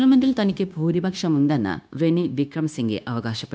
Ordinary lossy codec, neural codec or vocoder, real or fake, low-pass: none; codec, 16 kHz, 0.9 kbps, LongCat-Audio-Codec; fake; none